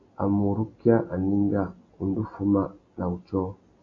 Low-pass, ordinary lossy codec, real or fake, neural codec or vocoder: 7.2 kHz; AAC, 32 kbps; real; none